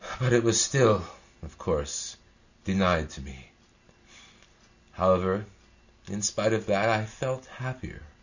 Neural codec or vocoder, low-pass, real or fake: none; 7.2 kHz; real